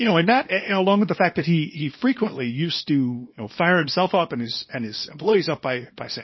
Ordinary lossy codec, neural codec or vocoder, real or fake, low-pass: MP3, 24 kbps; codec, 24 kHz, 0.9 kbps, WavTokenizer, small release; fake; 7.2 kHz